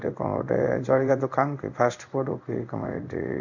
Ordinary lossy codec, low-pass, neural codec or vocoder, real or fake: none; 7.2 kHz; codec, 16 kHz in and 24 kHz out, 1 kbps, XY-Tokenizer; fake